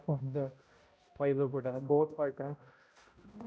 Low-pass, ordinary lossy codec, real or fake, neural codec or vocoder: none; none; fake; codec, 16 kHz, 0.5 kbps, X-Codec, HuBERT features, trained on balanced general audio